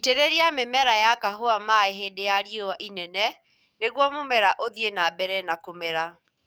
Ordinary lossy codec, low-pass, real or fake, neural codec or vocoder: none; none; fake; codec, 44.1 kHz, 7.8 kbps, DAC